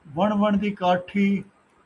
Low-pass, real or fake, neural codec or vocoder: 10.8 kHz; real; none